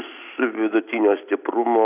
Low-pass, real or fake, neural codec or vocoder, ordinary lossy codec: 3.6 kHz; real; none; AAC, 32 kbps